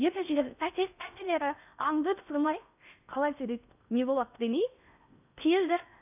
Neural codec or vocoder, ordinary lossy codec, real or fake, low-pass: codec, 16 kHz in and 24 kHz out, 0.6 kbps, FocalCodec, streaming, 4096 codes; none; fake; 3.6 kHz